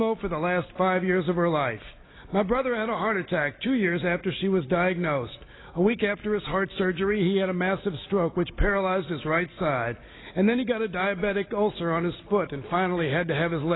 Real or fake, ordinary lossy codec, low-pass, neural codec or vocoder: real; AAC, 16 kbps; 7.2 kHz; none